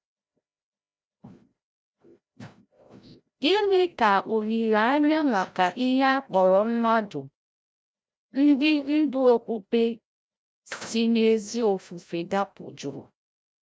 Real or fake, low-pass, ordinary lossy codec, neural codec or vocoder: fake; none; none; codec, 16 kHz, 0.5 kbps, FreqCodec, larger model